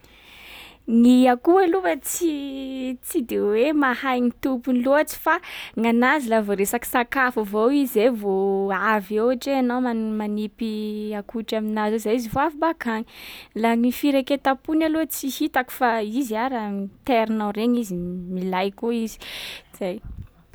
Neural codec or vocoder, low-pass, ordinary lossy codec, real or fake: none; none; none; real